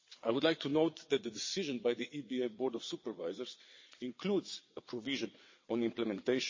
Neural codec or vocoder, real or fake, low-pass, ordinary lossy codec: vocoder, 22.05 kHz, 80 mel bands, WaveNeXt; fake; 7.2 kHz; MP3, 32 kbps